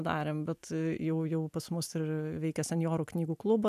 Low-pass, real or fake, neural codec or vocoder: 14.4 kHz; real; none